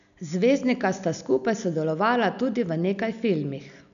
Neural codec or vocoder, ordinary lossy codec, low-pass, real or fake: none; none; 7.2 kHz; real